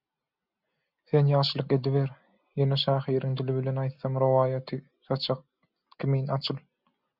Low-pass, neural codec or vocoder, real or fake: 5.4 kHz; none; real